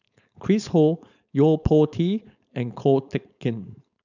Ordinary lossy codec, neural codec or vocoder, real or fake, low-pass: none; codec, 16 kHz, 4.8 kbps, FACodec; fake; 7.2 kHz